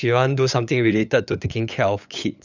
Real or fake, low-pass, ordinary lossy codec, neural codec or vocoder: fake; 7.2 kHz; none; codec, 16 kHz, 8 kbps, FunCodec, trained on LibriTTS, 25 frames a second